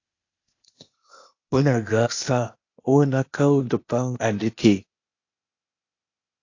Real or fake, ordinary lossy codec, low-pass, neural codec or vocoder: fake; AAC, 48 kbps; 7.2 kHz; codec, 16 kHz, 0.8 kbps, ZipCodec